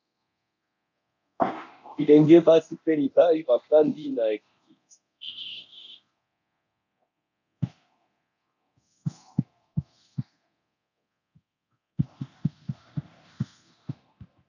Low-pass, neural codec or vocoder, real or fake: 7.2 kHz; codec, 24 kHz, 0.9 kbps, DualCodec; fake